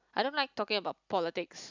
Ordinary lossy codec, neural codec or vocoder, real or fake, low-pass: none; none; real; 7.2 kHz